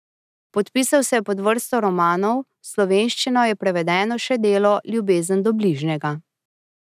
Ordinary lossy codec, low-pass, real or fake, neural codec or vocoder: none; 14.4 kHz; real; none